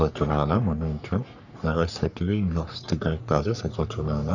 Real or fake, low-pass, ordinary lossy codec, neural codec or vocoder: fake; 7.2 kHz; none; codec, 44.1 kHz, 3.4 kbps, Pupu-Codec